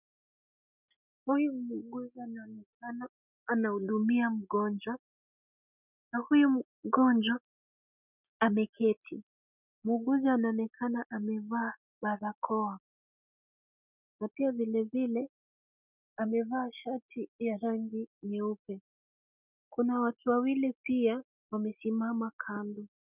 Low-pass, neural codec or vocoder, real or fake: 3.6 kHz; none; real